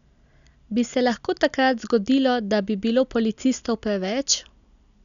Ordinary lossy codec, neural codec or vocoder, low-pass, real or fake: none; none; 7.2 kHz; real